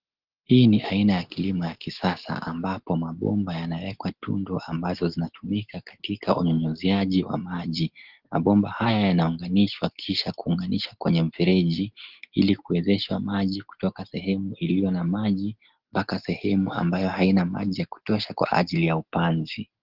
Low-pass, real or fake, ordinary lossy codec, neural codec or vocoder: 5.4 kHz; real; Opus, 16 kbps; none